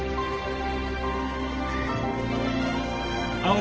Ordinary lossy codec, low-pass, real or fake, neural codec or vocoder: Opus, 16 kbps; 7.2 kHz; real; none